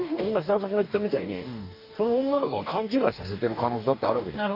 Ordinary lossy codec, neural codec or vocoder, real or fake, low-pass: none; codec, 44.1 kHz, 2.6 kbps, DAC; fake; 5.4 kHz